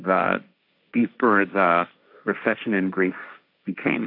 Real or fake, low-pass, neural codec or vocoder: fake; 5.4 kHz; codec, 16 kHz, 1.1 kbps, Voila-Tokenizer